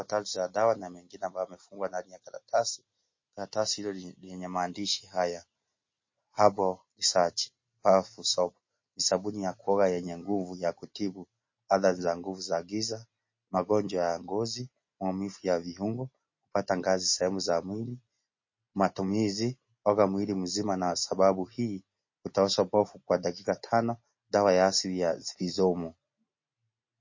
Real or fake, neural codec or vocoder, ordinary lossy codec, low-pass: real; none; MP3, 32 kbps; 7.2 kHz